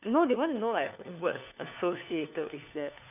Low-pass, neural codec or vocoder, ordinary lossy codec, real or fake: 3.6 kHz; codec, 16 kHz, 4 kbps, FunCodec, trained on LibriTTS, 50 frames a second; none; fake